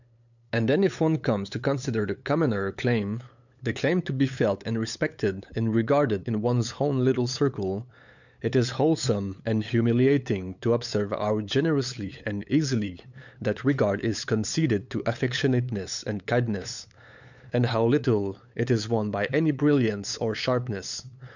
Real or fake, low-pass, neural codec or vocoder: fake; 7.2 kHz; codec, 16 kHz, 8 kbps, FunCodec, trained on LibriTTS, 25 frames a second